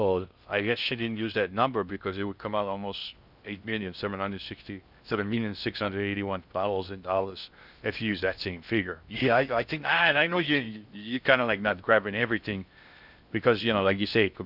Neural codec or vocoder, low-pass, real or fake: codec, 16 kHz in and 24 kHz out, 0.6 kbps, FocalCodec, streaming, 2048 codes; 5.4 kHz; fake